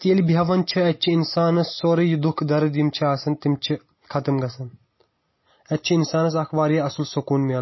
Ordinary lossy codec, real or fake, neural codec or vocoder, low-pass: MP3, 24 kbps; real; none; 7.2 kHz